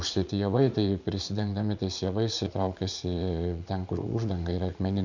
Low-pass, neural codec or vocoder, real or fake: 7.2 kHz; vocoder, 44.1 kHz, 80 mel bands, Vocos; fake